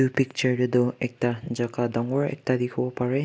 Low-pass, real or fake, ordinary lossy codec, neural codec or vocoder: none; real; none; none